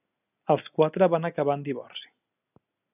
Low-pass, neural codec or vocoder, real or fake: 3.6 kHz; none; real